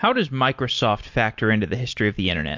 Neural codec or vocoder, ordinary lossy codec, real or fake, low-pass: none; MP3, 48 kbps; real; 7.2 kHz